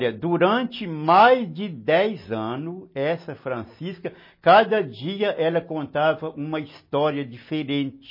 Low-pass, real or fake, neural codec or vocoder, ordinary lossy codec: 5.4 kHz; real; none; MP3, 24 kbps